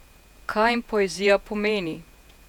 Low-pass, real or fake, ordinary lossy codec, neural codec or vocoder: 19.8 kHz; fake; none; vocoder, 48 kHz, 128 mel bands, Vocos